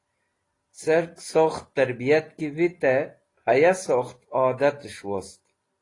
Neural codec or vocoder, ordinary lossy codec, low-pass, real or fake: none; AAC, 32 kbps; 10.8 kHz; real